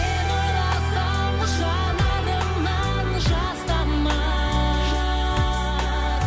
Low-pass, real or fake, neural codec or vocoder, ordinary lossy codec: none; real; none; none